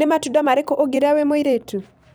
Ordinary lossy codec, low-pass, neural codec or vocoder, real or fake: none; none; vocoder, 44.1 kHz, 128 mel bands every 256 samples, BigVGAN v2; fake